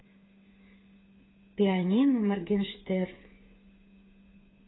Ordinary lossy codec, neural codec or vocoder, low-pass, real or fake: AAC, 16 kbps; codec, 16 kHz, 8 kbps, FreqCodec, smaller model; 7.2 kHz; fake